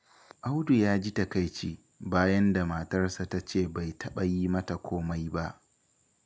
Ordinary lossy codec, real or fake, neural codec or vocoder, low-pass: none; real; none; none